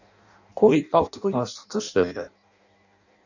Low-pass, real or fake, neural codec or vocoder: 7.2 kHz; fake; codec, 16 kHz in and 24 kHz out, 0.6 kbps, FireRedTTS-2 codec